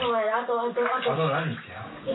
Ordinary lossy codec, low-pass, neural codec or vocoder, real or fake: AAC, 16 kbps; 7.2 kHz; none; real